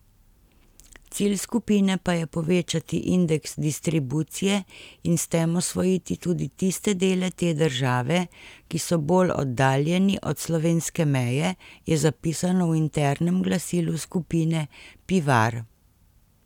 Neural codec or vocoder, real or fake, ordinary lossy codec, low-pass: none; real; none; 19.8 kHz